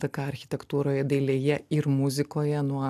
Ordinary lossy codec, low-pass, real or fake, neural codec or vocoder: MP3, 96 kbps; 14.4 kHz; real; none